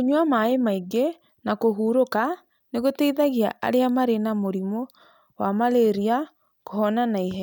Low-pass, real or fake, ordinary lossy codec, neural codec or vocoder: none; real; none; none